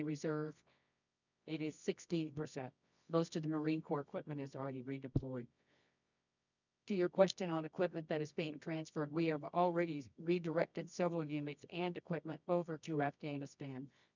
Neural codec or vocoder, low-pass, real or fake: codec, 24 kHz, 0.9 kbps, WavTokenizer, medium music audio release; 7.2 kHz; fake